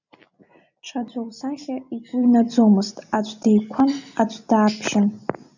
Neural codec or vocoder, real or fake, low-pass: none; real; 7.2 kHz